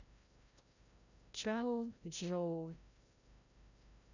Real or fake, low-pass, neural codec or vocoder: fake; 7.2 kHz; codec, 16 kHz, 0.5 kbps, FreqCodec, larger model